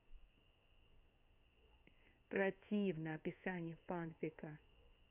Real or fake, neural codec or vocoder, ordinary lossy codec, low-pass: fake; codec, 16 kHz in and 24 kHz out, 1 kbps, XY-Tokenizer; none; 3.6 kHz